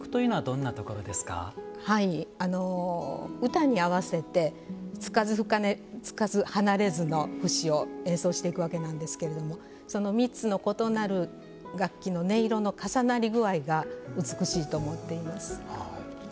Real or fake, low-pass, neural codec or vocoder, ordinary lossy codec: real; none; none; none